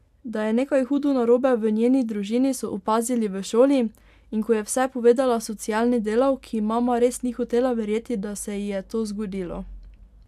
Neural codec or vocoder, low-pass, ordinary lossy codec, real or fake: none; 14.4 kHz; AAC, 96 kbps; real